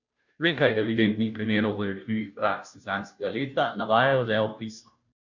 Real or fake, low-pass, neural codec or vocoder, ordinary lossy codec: fake; 7.2 kHz; codec, 16 kHz, 0.5 kbps, FunCodec, trained on Chinese and English, 25 frames a second; none